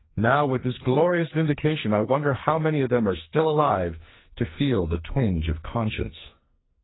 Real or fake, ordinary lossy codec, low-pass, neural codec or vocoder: fake; AAC, 16 kbps; 7.2 kHz; codec, 44.1 kHz, 2.6 kbps, SNAC